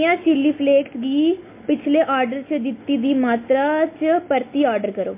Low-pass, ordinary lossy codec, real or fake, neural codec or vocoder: 3.6 kHz; MP3, 24 kbps; real; none